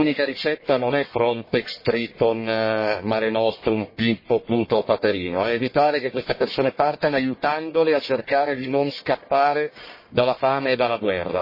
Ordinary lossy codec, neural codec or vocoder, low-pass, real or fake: MP3, 24 kbps; codec, 44.1 kHz, 1.7 kbps, Pupu-Codec; 5.4 kHz; fake